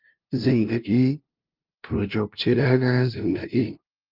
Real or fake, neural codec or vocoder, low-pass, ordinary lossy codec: fake; codec, 16 kHz, 0.5 kbps, FunCodec, trained on LibriTTS, 25 frames a second; 5.4 kHz; Opus, 32 kbps